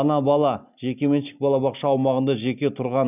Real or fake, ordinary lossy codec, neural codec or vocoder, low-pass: real; none; none; 3.6 kHz